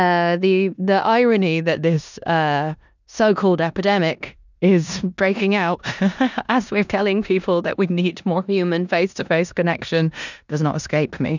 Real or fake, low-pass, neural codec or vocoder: fake; 7.2 kHz; codec, 16 kHz in and 24 kHz out, 0.9 kbps, LongCat-Audio-Codec, four codebook decoder